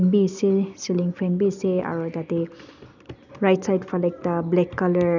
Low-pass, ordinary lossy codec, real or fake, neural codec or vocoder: 7.2 kHz; none; real; none